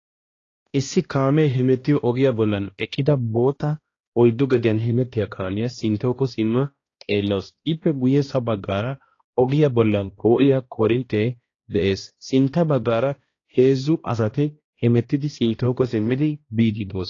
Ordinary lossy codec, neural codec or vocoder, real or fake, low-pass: AAC, 32 kbps; codec, 16 kHz, 1 kbps, X-Codec, HuBERT features, trained on balanced general audio; fake; 7.2 kHz